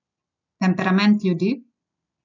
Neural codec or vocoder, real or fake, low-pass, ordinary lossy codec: none; real; 7.2 kHz; none